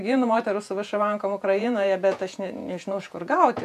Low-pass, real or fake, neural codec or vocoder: 14.4 kHz; fake; vocoder, 48 kHz, 128 mel bands, Vocos